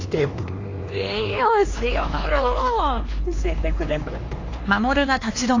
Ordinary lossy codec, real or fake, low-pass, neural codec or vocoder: AAC, 32 kbps; fake; 7.2 kHz; codec, 16 kHz, 2 kbps, X-Codec, HuBERT features, trained on LibriSpeech